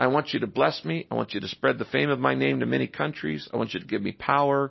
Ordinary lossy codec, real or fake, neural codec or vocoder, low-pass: MP3, 24 kbps; real; none; 7.2 kHz